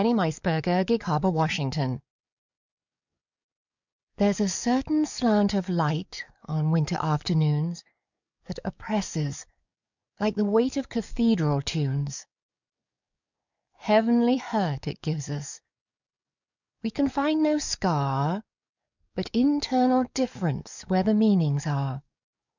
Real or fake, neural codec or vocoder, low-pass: fake; codec, 44.1 kHz, 7.8 kbps, DAC; 7.2 kHz